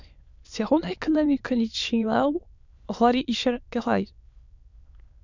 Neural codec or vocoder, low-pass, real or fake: autoencoder, 22.05 kHz, a latent of 192 numbers a frame, VITS, trained on many speakers; 7.2 kHz; fake